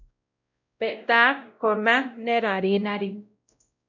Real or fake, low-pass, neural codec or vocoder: fake; 7.2 kHz; codec, 16 kHz, 0.5 kbps, X-Codec, WavLM features, trained on Multilingual LibriSpeech